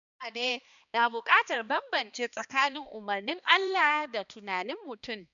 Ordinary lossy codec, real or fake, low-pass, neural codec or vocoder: MP3, 96 kbps; fake; 7.2 kHz; codec, 16 kHz, 2 kbps, X-Codec, HuBERT features, trained on balanced general audio